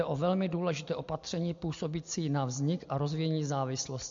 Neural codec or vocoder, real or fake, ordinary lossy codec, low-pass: none; real; MP3, 48 kbps; 7.2 kHz